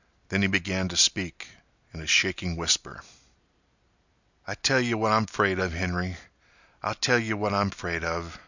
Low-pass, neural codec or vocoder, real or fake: 7.2 kHz; none; real